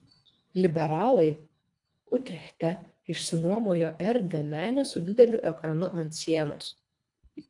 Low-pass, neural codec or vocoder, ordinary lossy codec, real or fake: 10.8 kHz; codec, 24 kHz, 1.5 kbps, HILCodec; AAC, 64 kbps; fake